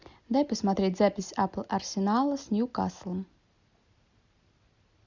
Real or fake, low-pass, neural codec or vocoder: real; 7.2 kHz; none